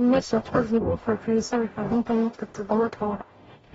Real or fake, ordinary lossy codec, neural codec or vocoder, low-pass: fake; AAC, 24 kbps; codec, 44.1 kHz, 0.9 kbps, DAC; 19.8 kHz